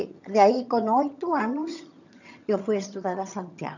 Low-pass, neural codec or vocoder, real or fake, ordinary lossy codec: 7.2 kHz; vocoder, 22.05 kHz, 80 mel bands, HiFi-GAN; fake; none